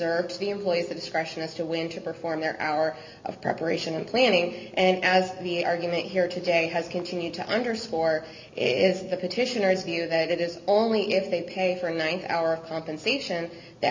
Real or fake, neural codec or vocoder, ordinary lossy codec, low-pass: real; none; MP3, 64 kbps; 7.2 kHz